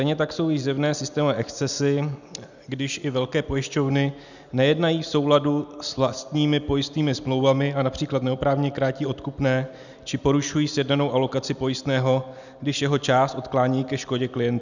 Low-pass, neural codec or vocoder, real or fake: 7.2 kHz; none; real